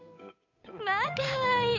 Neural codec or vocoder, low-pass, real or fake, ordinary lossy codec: codec, 16 kHz, 8 kbps, FunCodec, trained on Chinese and English, 25 frames a second; 7.2 kHz; fake; none